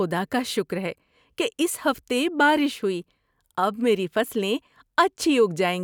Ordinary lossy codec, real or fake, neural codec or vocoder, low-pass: none; real; none; none